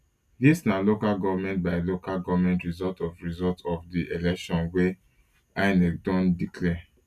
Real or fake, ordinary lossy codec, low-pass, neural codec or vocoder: real; none; 14.4 kHz; none